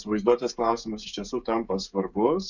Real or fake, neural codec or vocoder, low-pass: fake; codec, 16 kHz, 16 kbps, FreqCodec, smaller model; 7.2 kHz